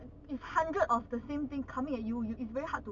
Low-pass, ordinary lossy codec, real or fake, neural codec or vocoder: 7.2 kHz; none; real; none